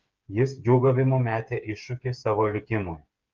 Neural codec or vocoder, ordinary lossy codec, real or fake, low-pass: codec, 16 kHz, 8 kbps, FreqCodec, smaller model; Opus, 24 kbps; fake; 7.2 kHz